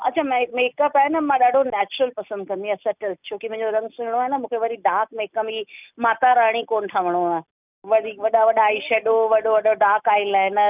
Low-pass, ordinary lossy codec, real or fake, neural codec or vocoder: 3.6 kHz; none; real; none